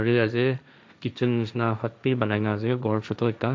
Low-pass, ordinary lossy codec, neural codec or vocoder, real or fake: none; none; codec, 16 kHz, 1.1 kbps, Voila-Tokenizer; fake